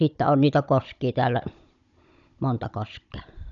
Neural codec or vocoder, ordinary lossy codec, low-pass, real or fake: codec, 16 kHz, 16 kbps, FunCodec, trained on Chinese and English, 50 frames a second; none; 7.2 kHz; fake